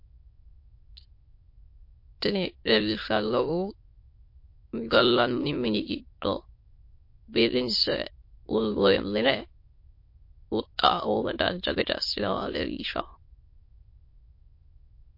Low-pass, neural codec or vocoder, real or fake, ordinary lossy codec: 5.4 kHz; autoencoder, 22.05 kHz, a latent of 192 numbers a frame, VITS, trained on many speakers; fake; MP3, 32 kbps